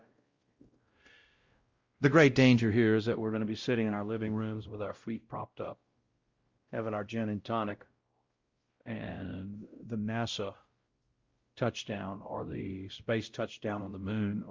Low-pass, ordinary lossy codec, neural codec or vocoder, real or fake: 7.2 kHz; Opus, 32 kbps; codec, 16 kHz, 0.5 kbps, X-Codec, WavLM features, trained on Multilingual LibriSpeech; fake